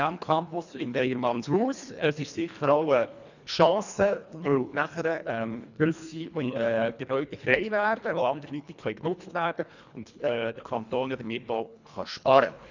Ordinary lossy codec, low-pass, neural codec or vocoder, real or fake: none; 7.2 kHz; codec, 24 kHz, 1.5 kbps, HILCodec; fake